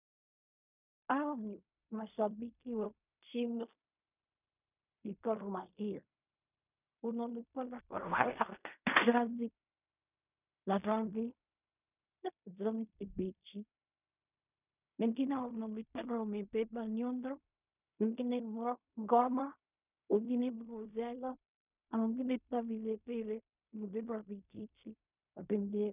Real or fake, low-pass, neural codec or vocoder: fake; 3.6 kHz; codec, 16 kHz in and 24 kHz out, 0.4 kbps, LongCat-Audio-Codec, fine tuned four codebook decoder